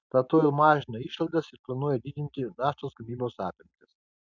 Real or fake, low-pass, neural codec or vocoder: real; 7.2 kHz; none